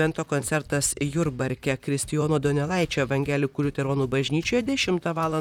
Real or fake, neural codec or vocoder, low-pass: fake; vocoder, 44.1 kHz, 128 mel bands, Pupu-Vocoder; 19.8 kHz